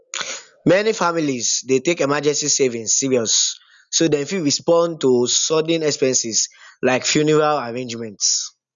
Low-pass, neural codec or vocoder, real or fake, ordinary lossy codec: 7.2 kHz; none; real; none